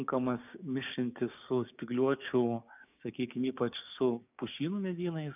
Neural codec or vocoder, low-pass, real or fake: codec, 16 kHz, 6 kbps, DAC; 3.6 kHz; fake